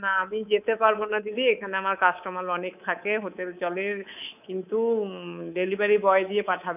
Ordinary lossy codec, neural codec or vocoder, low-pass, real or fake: none; codec, 24 kHz, 3.1 kbps, DualCodec; 3.6 kHz; fake